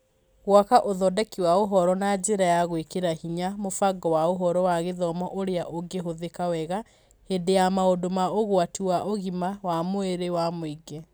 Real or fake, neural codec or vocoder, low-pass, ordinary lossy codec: real; none; none; none